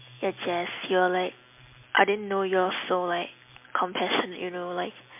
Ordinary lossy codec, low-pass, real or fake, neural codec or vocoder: MP3, 32 kbps; 3.6 kHz; real; none